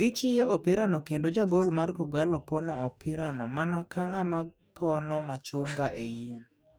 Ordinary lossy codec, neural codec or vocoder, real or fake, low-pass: none; codec, 44.1 kHz, 2.6 kbps, DAC; fake; none